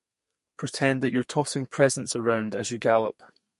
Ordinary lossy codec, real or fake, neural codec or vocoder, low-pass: MP3, 48 kbps; fake; codec, 32 kHz, 1.9 kbps, SNAC; 14.4 kHz